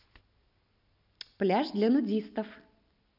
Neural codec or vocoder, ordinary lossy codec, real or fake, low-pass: none; none; real; 5.4 kHz